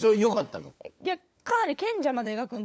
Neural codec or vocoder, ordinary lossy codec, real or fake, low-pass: codec, 16 kHz, 4 kbps, FunCodec, trained on LibriTTS, 50 frames a second; none; fake; none